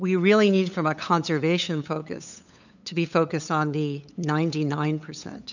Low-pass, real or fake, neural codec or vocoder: 7.2 kHz; fake; codec, 16 kHz, 8 kbps, FunCodec, trained on LibriTTS, 25 frames a second